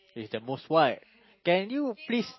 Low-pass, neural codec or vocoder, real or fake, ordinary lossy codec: 7.2 kHz; none; real; MP3, 24 kbps